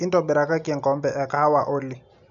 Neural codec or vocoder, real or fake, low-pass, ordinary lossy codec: none; real; 7.2 kHz; none